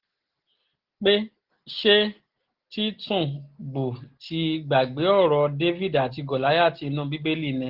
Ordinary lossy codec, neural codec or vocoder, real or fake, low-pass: Opus, 16 kbps; none; real; 5.4 kHz